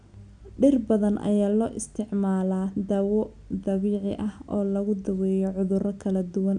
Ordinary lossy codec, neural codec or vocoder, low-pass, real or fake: none; none; 9.9 kHz; real